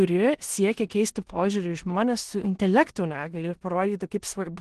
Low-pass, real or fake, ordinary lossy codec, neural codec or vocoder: 10.8 kHz; fake; Opus, 16 kbps; codec, 16 kHz in and 24 kHz out, 0.9 kbps, LongCat-Audio-Codec, four codebook decoder